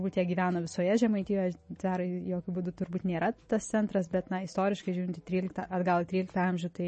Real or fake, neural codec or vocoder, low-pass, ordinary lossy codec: real; none; 10.8 kHz; MP3, 32 kbps